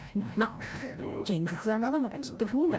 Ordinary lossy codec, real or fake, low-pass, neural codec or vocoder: none; fake; none; codec, 16 kHz, 0.5 kbps, FreqCodec, larger model